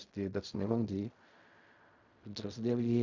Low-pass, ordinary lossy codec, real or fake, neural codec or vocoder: 7.2 kHz; Opus, 64 kbps; fake; codec, 16 kHz in and 24 kHz out, 0.4 kbps, LongCat-Audio-Codec, fine tuned four codebook decoder